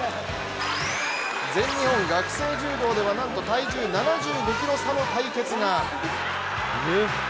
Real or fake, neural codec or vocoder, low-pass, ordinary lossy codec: real; none; none; none